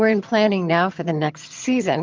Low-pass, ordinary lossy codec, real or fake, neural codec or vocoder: 7.2 kHz; Opus, 24 kbps; fake; vocoder, 22.05 kHz, 80 mel bands, HiFi-GAN